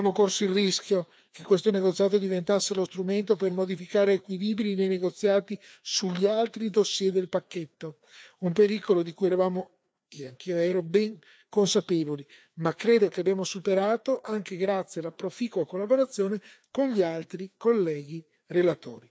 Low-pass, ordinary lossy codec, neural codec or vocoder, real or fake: none; none; codec, 16 kHz, 2 kbps, FreqCodec, larger model; fake